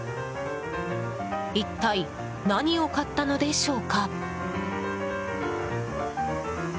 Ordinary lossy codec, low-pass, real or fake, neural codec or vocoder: none; none; real; none